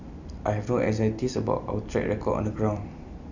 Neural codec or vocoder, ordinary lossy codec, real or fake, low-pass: vocoder, 44.1 kHz, 128 mel bands every 256 samples, BigVGAN v2; none; fake; 7.2 kHz